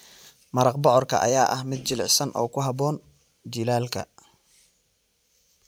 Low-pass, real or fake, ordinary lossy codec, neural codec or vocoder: none; fake; none; vocoder, 44.1 kHz, 128 mel bands every 512 samples, BigVGAN v2